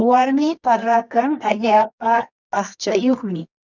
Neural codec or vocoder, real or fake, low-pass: codec, 24 kHz, 0.9 kbps, WavTokenizer, medium music audio release; fake; 7.2 kHz